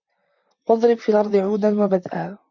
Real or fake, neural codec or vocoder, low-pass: fake; vocoder, 44.1 kHz, 128 mel bands, Pupu-Vocoder; 7.2 kHz